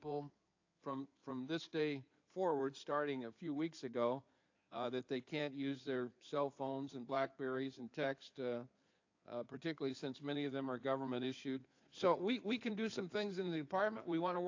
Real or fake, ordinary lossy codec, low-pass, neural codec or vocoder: fake; AAC, 48 kbps; 7.2 kHz; codec, 16 kHz in and 24 kHz out, 2.2 kbps, FireRedTTS-2 codec